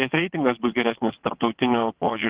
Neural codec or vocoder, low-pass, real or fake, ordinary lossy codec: vocoder, 22.05 kHz, 80 mel bands, WaveNeXt; 3.6 kHz; fake; Opus, 16 kbps